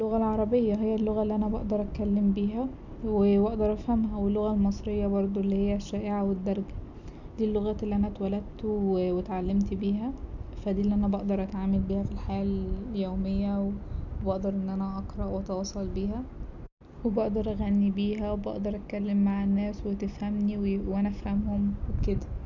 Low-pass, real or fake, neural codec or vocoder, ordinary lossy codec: 7.2 kHz; real; none; none